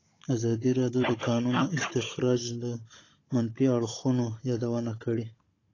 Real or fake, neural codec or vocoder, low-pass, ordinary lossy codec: fake; codec, 16 kHz, 16 kbps, FunCodec, trained on Chinese and English, 50 frames a second; 7.2 kHz; AAC, 32 kbps